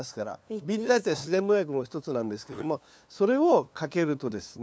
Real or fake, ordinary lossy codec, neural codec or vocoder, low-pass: fake; none; codec, 16 kHz, 2 kbps, FunCodec, trained on LibriTTS, 25 frames a second; none